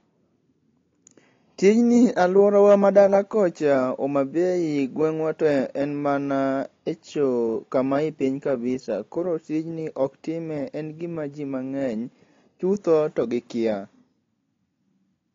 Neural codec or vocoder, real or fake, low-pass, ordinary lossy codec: none; real; 7.2 kHz; AAC, 32 kbps